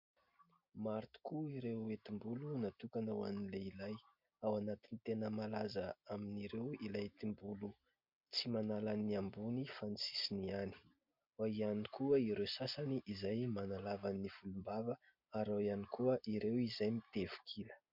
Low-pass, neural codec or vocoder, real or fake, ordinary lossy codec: 5.4 kHz; none; real; MP3, 48 kbps